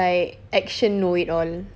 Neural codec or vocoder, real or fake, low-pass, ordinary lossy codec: none; real; none; none